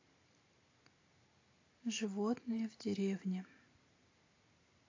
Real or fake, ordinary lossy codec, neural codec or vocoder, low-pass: real; AAC, 32 kbps; none; 7.2 kHz